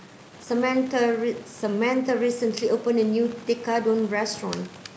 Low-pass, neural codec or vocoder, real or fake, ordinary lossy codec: none; none; real; none